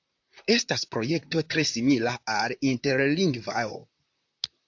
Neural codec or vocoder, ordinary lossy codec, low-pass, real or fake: vocoder, 44.1 kHz, 128 mel bands, Pupu-Vocoder; AAC, 48 kbps; 7.2 kHz; fake